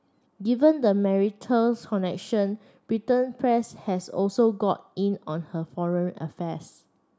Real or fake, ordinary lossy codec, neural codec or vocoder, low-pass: real; none; none; none